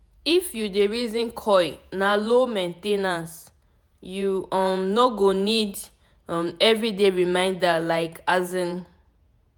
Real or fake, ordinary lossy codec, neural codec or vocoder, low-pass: fake; none; vocoder, 48 kHz, 128 mel bands, Vocos; none